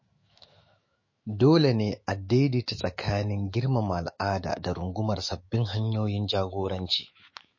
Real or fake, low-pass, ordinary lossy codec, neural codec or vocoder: fake; 7.2 kHz; MP3, 32 kbps; autoencoder, 48 kHz, 128 numbers a frame, DAC-VAE, trained on Japanese speech